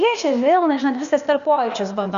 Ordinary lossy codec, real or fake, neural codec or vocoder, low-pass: Opus, 64 kbps; fake; codec, 16 kHz, 0.8 kbps, ZipCodec; 7.2 kHz